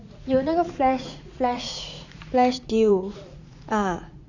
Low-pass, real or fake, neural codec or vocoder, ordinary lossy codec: 7.2 kHz; fake; vocoder, 44.1 kHz, 80 mel bands, Vocos; none